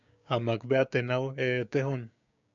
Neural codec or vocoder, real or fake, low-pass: codec, 16 kHz, 6 kbps, DAC; fake; 7.2 kHz